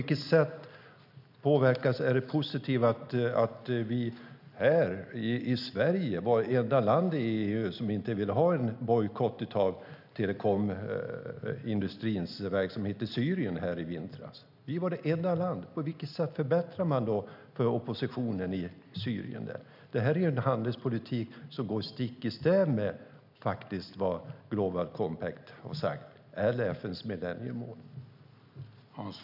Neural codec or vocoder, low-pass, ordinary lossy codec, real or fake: none; 5.4 kHz; none; real